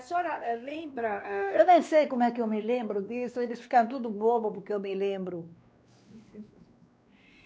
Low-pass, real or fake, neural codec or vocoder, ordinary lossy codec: none; fake; codec, 16 kHz, 2 kbps, X-Codec, WavLM features, trained on Multilingual LibriSpeech; none